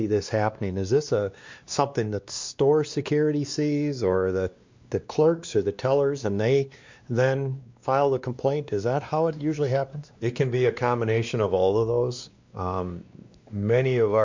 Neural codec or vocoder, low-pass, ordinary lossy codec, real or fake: codec, 16 kHz, 2 kbps, X-Codec, WavLM features, trained on Multilingual LibriSpeech; 7.2 kHz; MP3, 64 kbps; fake